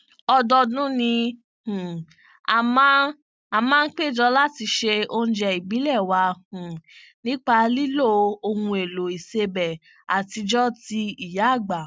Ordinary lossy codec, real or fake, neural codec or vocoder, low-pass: none; real; none; none